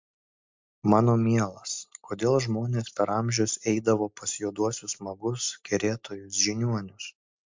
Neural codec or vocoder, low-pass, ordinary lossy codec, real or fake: none; 7.2 kHz; MP3, 64 kbps; real